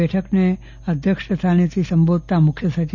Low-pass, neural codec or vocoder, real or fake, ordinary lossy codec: 7.2 kHz; none; real; none